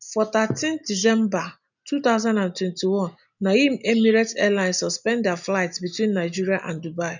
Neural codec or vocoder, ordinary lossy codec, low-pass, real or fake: none; none; 7.2 kHz; real